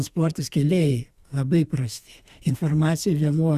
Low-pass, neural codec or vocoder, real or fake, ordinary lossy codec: 14.4 kHz; codec, 32 kHz, 1.9 kbps, SNAC; fake; Opus, 64 kbps